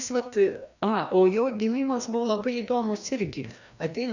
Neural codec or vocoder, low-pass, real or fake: codec, 16 kHz, 1 kbps, FreqCodec, larger model; 7.2 kHz; fake